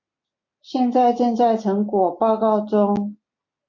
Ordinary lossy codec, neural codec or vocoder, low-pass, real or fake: MP3, 64 kbps; none; 7.2 kHz; real